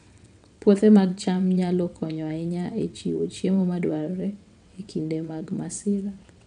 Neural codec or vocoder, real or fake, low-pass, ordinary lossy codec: vocoder, 22.05 kHz, 80 mel bands, WaveNeXt; fake; 9.9 kHz; none